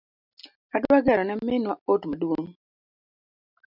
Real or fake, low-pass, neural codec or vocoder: real; 5.4 kHz; none